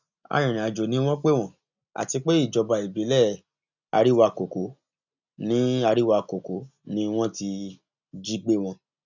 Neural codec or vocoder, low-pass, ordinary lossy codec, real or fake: none; 7.2 kHz; none; real